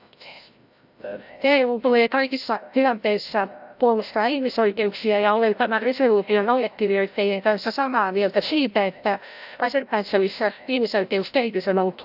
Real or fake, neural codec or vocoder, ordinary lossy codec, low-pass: fake; codec, 16 kHz, 0.5 kbps, FreqCodec, larger model; AAC, 48 kbps; 5.4 kHz